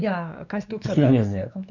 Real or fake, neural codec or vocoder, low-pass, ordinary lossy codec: fake; codec, 16 kHz, 2 kbps, X-Codec, WavLM features, trained on Multilingual LibriSpeech; 7.2 kHz; none